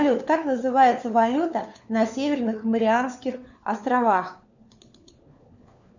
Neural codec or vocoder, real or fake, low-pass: codec, 16 kHz, 4 kbps, FunCodec, trained on LibriTTS, 50 frames a second; fake; 7.2 kHz